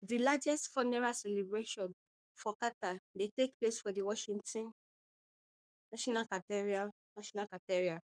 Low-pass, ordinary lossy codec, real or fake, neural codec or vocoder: 9.9 kHz; none; fake; codec, 44.1 kHz, 3.4 kbps, Pupu-Codec